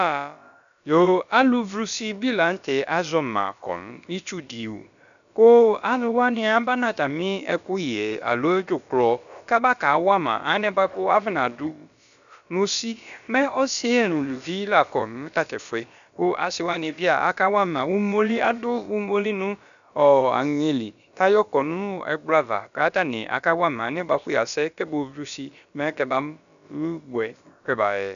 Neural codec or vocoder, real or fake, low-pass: codec, 16 kHz, about 1 kbps, DyCAST, with the encoder's durations; fake; 7.2 kHz